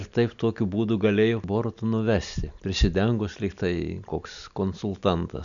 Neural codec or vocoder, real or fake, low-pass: none; real; 7.2 kHz